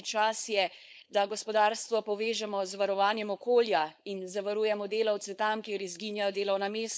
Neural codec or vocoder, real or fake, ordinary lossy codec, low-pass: codec, 16 kHz, 4.8 kbps, FACodec; fake; none; none